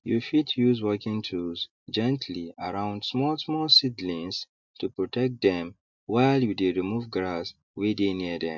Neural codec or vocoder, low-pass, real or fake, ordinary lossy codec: none; 7.2 kHz; real; MP3, 64 kbps